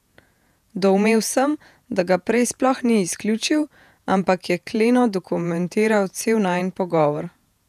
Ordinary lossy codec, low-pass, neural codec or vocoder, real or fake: none; 14.4 kHz; vocoder, 48 kHz, 128 mel bands, Vocos; fake